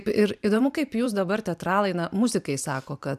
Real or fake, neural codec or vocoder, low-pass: fake; vocoder, 44.1 kHz, 128 mel bands every 512 samples, BigVGAN v2; 14.4 kHz